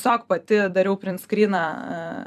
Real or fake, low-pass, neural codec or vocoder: fake; 14.4 kHz; vocoder, 44.1 kHz, 128 mel bands every 256 samples, BigVGAN v2